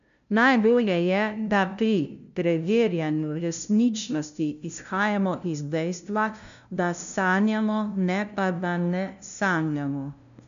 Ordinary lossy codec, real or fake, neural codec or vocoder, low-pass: none; fake; codec, 16 kHz, 0.5 kbps, FunCodec, trained on LibriTTS, 25 frames a second; 7.2 kHz